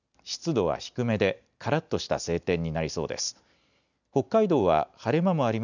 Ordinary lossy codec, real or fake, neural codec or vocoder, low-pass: none; real; none; 7.2 kHz